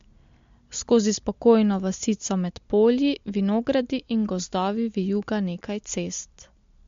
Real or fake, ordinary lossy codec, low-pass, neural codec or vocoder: real; MP3, 48 kbps; 7.2 kHz; none